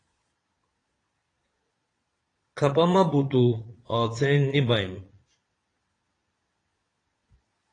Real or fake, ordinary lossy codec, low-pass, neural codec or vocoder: fake; AAC, 32 kbps; 9.9 kHz; vocoder, 22.05 kHz, 80 mel bands, Vocos